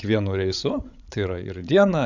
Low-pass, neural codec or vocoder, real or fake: 7.2 kHz; codec, 16 kHz, 16 kbps, FreqCodec, larger model; fake